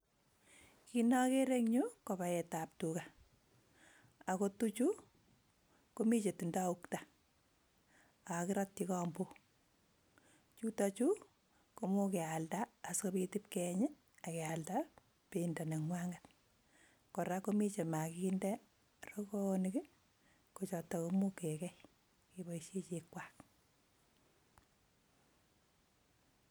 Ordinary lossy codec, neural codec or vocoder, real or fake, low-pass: none; none; real; none